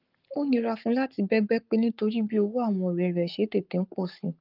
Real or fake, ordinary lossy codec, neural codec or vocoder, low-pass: fake; Opus, 32 kbps; codec, 44.1 kHz, 7.8 kbps, Pupu-Codec; 5.4 kHz